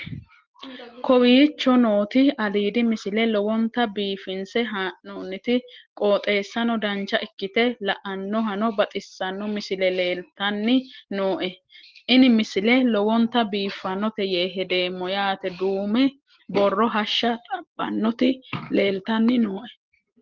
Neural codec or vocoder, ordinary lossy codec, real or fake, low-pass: none; Opus, 16 kbps; real; 7.2 kHz